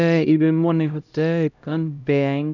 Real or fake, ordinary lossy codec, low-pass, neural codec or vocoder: fake; none; 7.2 kHz; codec, 16 kHz, 0.5 kbps, X-Codec, HuBERT features, trained on LibriSpeech